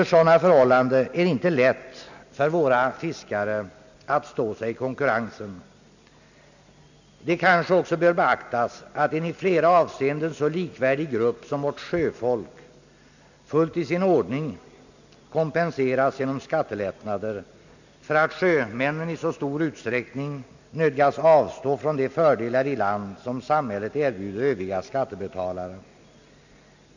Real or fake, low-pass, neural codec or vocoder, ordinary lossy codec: real; 7.2 kHz; none; none